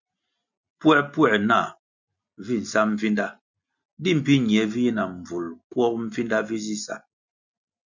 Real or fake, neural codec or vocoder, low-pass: real; none; 7.2 kHz